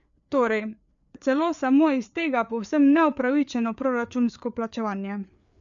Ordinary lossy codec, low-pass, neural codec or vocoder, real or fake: none; 7.2 kHz; codec, 16 kHz, 4 kbps, FreqCodec, larger model; fake